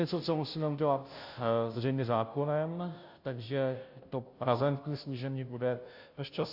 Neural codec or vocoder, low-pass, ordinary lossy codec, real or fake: codec, 16 kHz, 0.5 kbps, FunCodec, trained on Chinese and English, 25 frames a second; 5.4 kHz; AAC, 48 kbps; fake